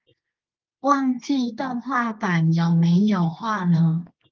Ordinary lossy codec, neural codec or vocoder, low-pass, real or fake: Opus, 32 kbps; codec, 24 kHz, 0.9 kbps, WavTokenizer, medium music audio release; 7.2 kHz; fake